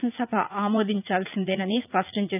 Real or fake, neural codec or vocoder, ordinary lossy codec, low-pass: fake; vocoder, 44.1 kHz, 80 mel bands, Vocos; none; 3.6 kHz